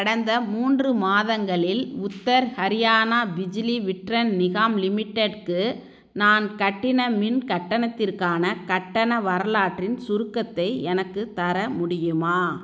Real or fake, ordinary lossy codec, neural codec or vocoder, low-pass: real; none; none; none